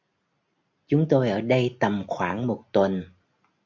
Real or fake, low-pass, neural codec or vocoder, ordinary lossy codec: real; 7.2 kHz; none; MP3, 64 kbps